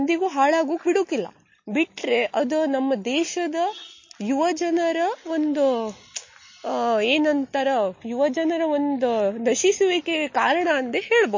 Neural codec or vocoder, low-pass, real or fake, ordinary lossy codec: none; 7.2 kHz; real; MP3, 32 kbps